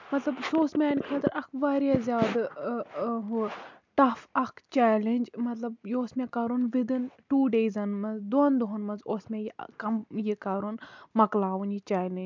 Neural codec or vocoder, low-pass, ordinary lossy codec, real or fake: none; 7.2 kHz; MP3, 64 kbps; real